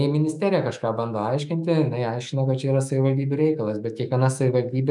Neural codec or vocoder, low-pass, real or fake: autoencoder, 48 kHz, 128 numbers a frame, DAC-VAE, trained on Japanese speech; 10.8 kHz; fake